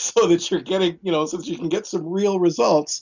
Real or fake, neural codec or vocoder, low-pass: real; none; 7.2 kHz